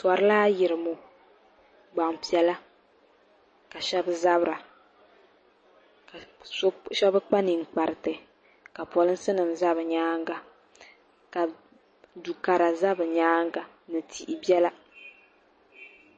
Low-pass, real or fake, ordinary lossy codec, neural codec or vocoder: 9.9 kHz; real; MP3, 32 kbps; none